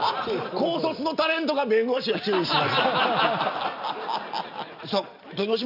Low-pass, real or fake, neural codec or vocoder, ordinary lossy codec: 5.4 kHz; real; none; none